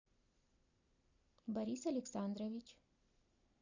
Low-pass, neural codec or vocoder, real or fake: 7.2 kHz; none; real